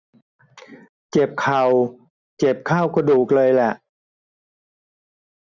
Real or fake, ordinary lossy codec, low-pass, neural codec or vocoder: real; none; 7.2 kHz; none